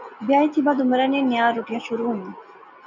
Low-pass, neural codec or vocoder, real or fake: 7.2 kHz; none; real